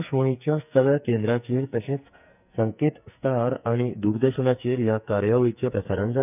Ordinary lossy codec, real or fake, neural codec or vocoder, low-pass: none; fake; codec, 44.1 kHz, 2.6 kbps, SNAC; 3.6 kHz